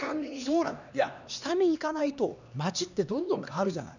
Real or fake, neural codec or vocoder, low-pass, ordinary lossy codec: fake; codec, 16 kHz, 2 kbps, X-Codec, HuBERT features, trained on LibriSpeech; 7.2 kHz; none